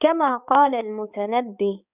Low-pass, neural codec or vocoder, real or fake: 3.6 kHz; codec, 16 kHz, 4 kbps, X-Codec, HuBERT features, trained on balanced general audio; fake